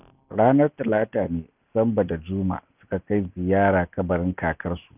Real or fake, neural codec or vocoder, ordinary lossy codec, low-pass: real; none; none; 3.6 kHz